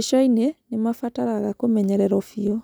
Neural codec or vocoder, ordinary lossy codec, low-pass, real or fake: none; none; none; real